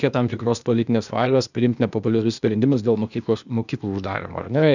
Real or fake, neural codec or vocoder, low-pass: fake; codec, 16 kHz, 0.8 kbps, ZipCodec; 7.2 kHz